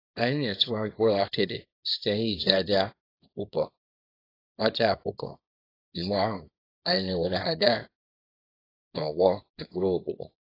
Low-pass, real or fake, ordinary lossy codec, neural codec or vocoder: 5.4 kHz; fake; AAC, 24 kbps; codec, 24 kHz, 0.9 kbps, WavTokenizer, small release